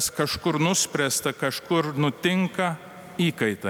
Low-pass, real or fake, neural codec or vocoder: 19.8 kHz; real; none